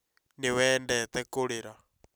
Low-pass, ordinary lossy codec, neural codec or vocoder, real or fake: none; none; none; real